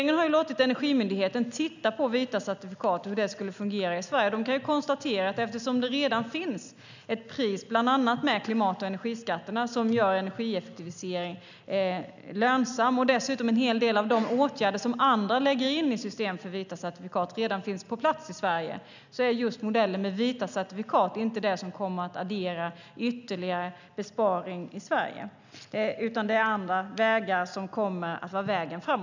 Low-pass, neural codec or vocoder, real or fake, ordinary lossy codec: 7.2 kHz; none; real; none